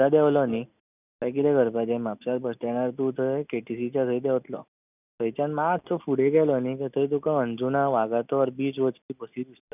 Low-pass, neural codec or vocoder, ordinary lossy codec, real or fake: 3.6 kHz; none; none; real